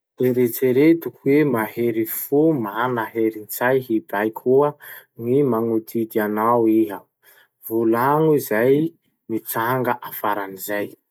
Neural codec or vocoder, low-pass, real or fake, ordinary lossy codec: none; none; real; none